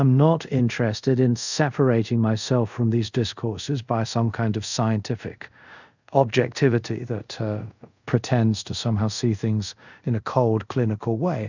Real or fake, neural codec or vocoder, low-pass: fake; codec, 24 kHz, 0.5 kbps, DualCodec; 7.2 kHz